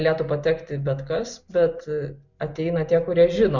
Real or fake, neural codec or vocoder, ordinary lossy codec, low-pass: real; none; MP3, 64 kbps; 7.2 kHz